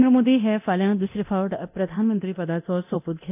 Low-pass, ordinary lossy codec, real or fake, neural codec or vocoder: 3.6 kHz; AAC, 32 kbps; fake; codec, 24 kHz, 0.9 kbps, DualCodec